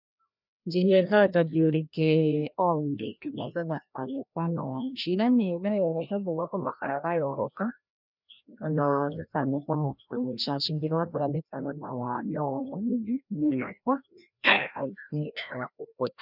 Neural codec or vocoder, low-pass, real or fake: codec, 16 kHz, 1 kbps, FreqCodec, larger model; 5.4 kHz; fake